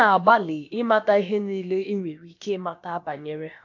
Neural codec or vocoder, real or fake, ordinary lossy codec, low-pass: codec, 16 kHz, about 1 kbps, DyCAST, with the encoder's durations; fake; AAC, 48 kbps; 7.2 kHz